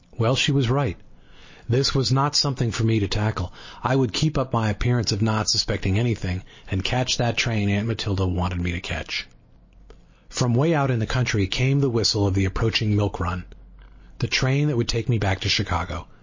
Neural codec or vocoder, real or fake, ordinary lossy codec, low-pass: none; real; MP3, 32 kbps; 7.2 kHz